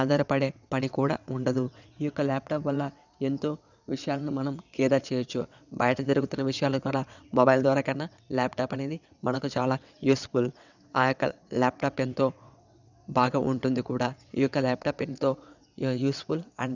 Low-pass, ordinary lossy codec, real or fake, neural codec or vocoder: 7.2 kHz; none; fake; vocoder, 22.05 kHz, 80 mel bands, WaveNeXt